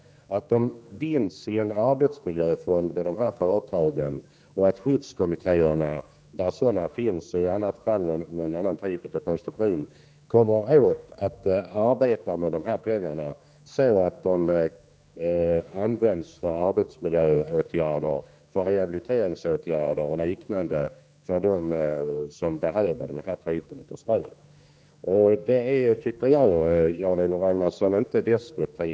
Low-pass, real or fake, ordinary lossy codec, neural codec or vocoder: none; fake; none; codec, 16 kHz, 2 kbps, X-Codec, HuBERT features, trained on general audio